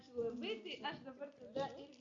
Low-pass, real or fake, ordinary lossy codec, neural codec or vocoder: 7.2 kHz; real; AAC, 64 kbps; none